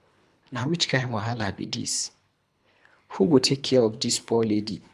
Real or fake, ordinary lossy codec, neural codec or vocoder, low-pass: fake; none; codec, 24 kHz, 3 kbps, HILCodec; none